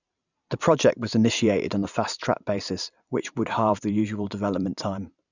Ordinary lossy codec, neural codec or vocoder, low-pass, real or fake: none; vocoder, 44.1 kHz, 128 mel bands every 512 samples, BigVGAN v2; 7.2 kHz; fake